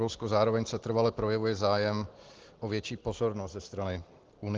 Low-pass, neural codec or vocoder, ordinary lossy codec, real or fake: 7.2 kHz; none; Opus, 16 kbps; real